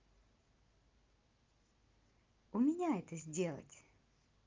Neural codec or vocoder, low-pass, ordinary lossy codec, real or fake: none; 7.2 kHz; Opus, 32 kbps; real